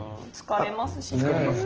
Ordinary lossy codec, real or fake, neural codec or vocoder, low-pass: Opus, 16 kbps; real; none; 7.2 kHz